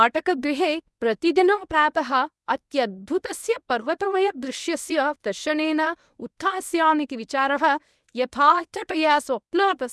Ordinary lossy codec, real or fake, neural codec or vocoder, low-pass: none; fake; codec, 24 kHz, 0.9 kbps, WavTokenizer, medium speech release version 1; none